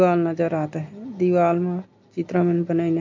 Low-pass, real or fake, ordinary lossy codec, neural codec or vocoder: 7.2 kHz; real; MP3, 48 kbps; none